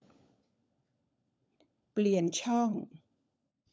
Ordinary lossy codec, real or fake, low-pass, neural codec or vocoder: none; fake; none; codec, 16 kHz, 16 kbps, FunCodec, trained on LibriTTS, 50 frames a second